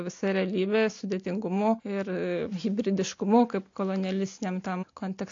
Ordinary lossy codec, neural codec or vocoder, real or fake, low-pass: AAC, 48 kbps; none; real; 7.2 kHz